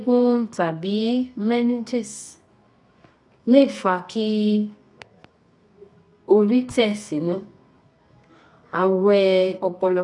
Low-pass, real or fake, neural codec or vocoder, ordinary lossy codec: 10.8 kHz; fake; codec, 24 kHz, 0.9 kbps, WavTokenizer, medium music audio release; none